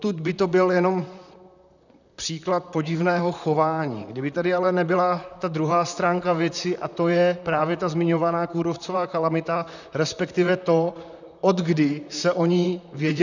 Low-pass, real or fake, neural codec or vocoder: 7.2 kHz; fake; vocoder, 44.1 kHz, 128 mel bands, Pupu-Vocoder